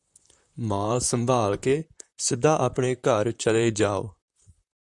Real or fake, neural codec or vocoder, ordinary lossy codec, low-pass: fake; vocoder, 44.1 kHz, 128 mel bands, Pupu-Vocoder; MP3, 96 kbps; 10.8 kHz